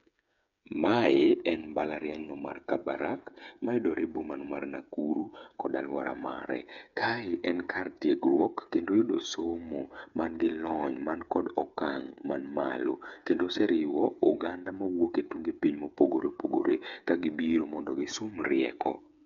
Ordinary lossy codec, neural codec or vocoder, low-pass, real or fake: none; codec, 16 kHz, 8 kbps, FreqCodec, smaller model; 7.2 kHz; fake